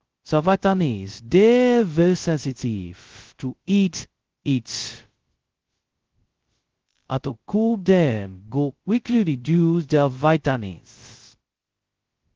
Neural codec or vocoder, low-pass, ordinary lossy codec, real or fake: codec, 16 kHz, 0.2 kbps, FocalCodec; 7.2 kHz; Opus, 16 kbps; fake